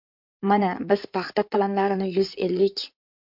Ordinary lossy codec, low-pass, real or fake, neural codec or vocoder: MP3, 48 kbps; 5.4 kHz; fake; codec, 16 kHz in and 24 kHz out, 2.2 kbps, FireRedTTS-2 codec